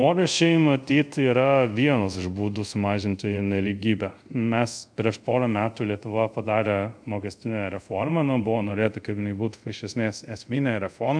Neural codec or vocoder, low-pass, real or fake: codec, 24 kHz, 0.5 kbps, DualCodec; 9.9 kHz; fake